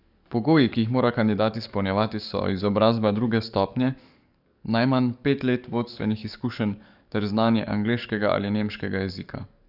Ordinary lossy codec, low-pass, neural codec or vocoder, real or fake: none; 5.4 kHz; codec, 44.1 kHz, 7.8 kbps, DAC; fake